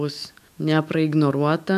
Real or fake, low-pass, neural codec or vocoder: fake; 14.4 kHz; autoencoder, 48 kHz, 128 numbers a frame, DAC-VAE, trained on Japanese speech